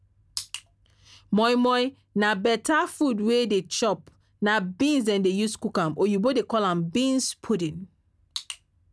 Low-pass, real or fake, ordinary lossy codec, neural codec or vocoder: none; real; none; none